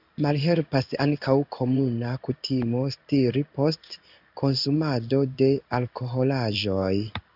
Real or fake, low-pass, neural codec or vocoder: fake; 5.4 kHz; codec, 16 kHz in and 24 kHz out, 1 kbps, XY-Tokenizer